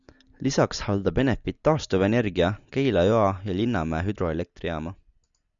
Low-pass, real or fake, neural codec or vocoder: 7.2 kHz; real; none